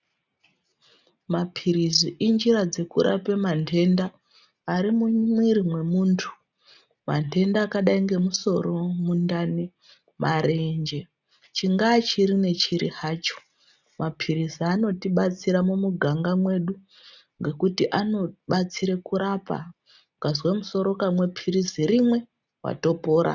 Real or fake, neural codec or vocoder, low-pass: real; none; 7.2 kHz